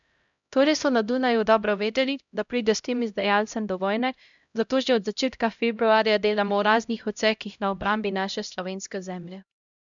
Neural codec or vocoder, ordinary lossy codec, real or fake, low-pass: codec, 16 kHz, 0.5 kbps, X-Codec, HuBERT features, trained on LibriSpeech; MP3, 96 kbps; fake; 7.2 kHz